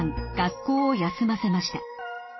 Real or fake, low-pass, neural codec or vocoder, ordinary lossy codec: real; 7.2 kHz; none; MP3, 24 kbps